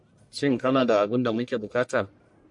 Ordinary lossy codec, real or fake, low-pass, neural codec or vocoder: MP3, 64 kbps; fake; 10.8 kHz; codec, 44.1 kHz, 1.7 kbps, Pupu-Codec